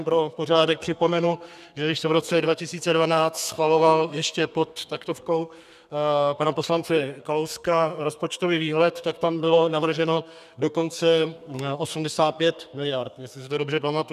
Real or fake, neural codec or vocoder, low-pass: fake; codec, 32 kHz, 1.9 kbps, SNAC; 14.4 kHz